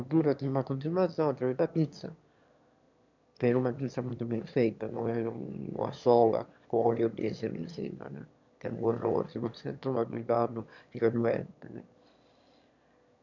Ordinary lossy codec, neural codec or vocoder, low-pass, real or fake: none; autoencoder, 22.05 kHz, a latent of 192 numbers a frame, VITS, trained on one speaker; 7.2 kHz; fake